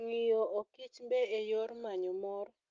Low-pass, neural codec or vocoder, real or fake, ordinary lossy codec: 7.2 kHz; none; real; Opus, 24 kbps